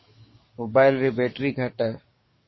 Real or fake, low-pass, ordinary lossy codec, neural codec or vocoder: fake; 7.2 kHz; MP3, 24 kbps; codec, 44.1 kHz, 7.8 kbps, DAC